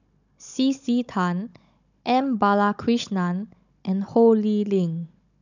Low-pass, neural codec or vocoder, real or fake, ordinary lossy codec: 7.2 kHz; codec, 16 kHz, 16 kbps, FunCodec, trained on Chinese and English, 50 frames a second; fake; none